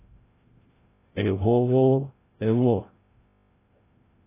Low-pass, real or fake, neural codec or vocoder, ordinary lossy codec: 3.6 kHz; fake; codec, 16 kHz, 0.5 kbps, FreqCodec, larger model; AAC, 16 kbps